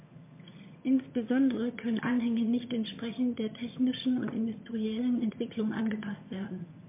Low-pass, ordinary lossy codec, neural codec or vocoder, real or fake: 3.6 kHz; MP3, 24 kbps; vocoder, 22.05 kHz, 80 mel bands, HiFi-GAN; fake